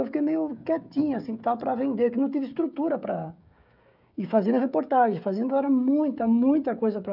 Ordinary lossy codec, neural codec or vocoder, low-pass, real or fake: none; codec, 16 kHz, 16 kbps, FreqCodec, smaller model; 5.4 kHz; fake